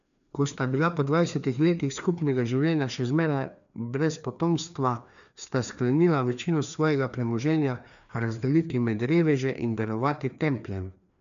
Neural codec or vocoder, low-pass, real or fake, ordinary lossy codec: codec, 16 kHz, 2 kbps, FreqCodec, larger model; 7.2 kHz; fake; none